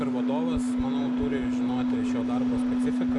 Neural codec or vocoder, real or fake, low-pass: none; real; 10.8 kHz